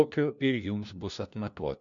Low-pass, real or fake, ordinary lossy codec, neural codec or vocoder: 7.2 kHz; fake; MP3, 64 kbps; codec, 16 kHz, 1 kbps, FunCodec, trained on LibriTTS, 50 frames a second